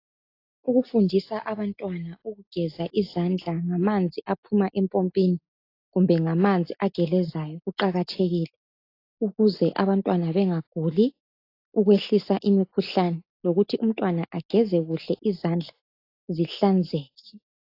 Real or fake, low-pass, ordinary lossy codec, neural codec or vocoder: real; 5.4 kHz; AAC, 32 kbps; none